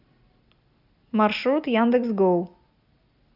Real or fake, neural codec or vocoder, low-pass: real; none; 5.4 kHz